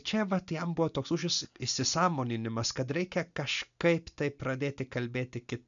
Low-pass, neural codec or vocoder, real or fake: 7.2 kHz; none; real